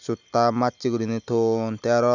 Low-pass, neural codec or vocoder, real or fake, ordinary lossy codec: 7.2 kHz; none; real; none